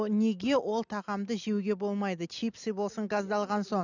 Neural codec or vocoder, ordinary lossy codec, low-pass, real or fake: none; none; 7.2 kHz; real